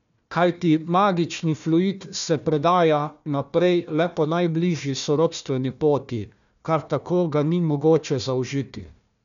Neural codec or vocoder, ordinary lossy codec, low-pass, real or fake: codec, 16 kHz, 1 kbps, FunCodec, trained on Chinese and English, 50 frames a second; none; 7.2 kHz; fake